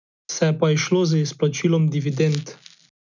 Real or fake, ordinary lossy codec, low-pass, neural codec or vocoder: real; none; 7.2 kHz; none